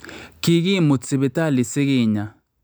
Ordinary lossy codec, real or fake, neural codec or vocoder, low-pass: none; real; none; none